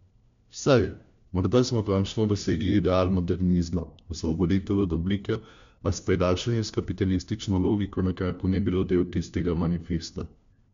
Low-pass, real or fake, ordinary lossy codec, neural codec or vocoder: 7.2 kHz; fake; MP3, 64 kbps; codec, 16 kHz, 1 kbps, FunCodec, trained on LibriTTS, 50 frames a second